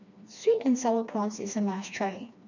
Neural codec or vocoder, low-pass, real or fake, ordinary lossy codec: codec, 16 kHz, 2 kbps, FreqCodec, smaller model; 7.2 kHz; fake; none